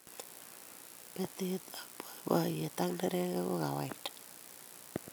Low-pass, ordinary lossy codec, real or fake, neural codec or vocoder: none; none; real; none